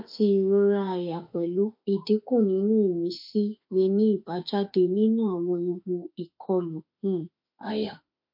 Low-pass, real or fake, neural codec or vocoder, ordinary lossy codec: 5.4 kHz; fake; autoencoder, 48 kHz, 32 numbers a frame, DAC-VAE, trained on Japanese speech; MP3, 32 kbps